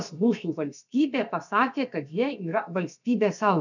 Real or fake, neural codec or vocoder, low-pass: fake; codec, 16 kHz, about 1 kbps, DyCAST, with the encoder's durations; 7.2 kHz